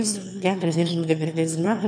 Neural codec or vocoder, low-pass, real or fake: autoencoder, 22.05 kHz, a latent of 192 numbers a frame, VITS, trained on one speaker; 9.9 kHz; fake